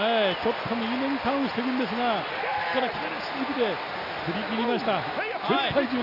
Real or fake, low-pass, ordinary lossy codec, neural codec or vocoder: real; 5.4 kHz; none; none